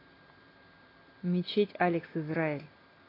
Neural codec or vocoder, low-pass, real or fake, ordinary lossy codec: none; 5.4 kHz; real; AAC, 24 kbps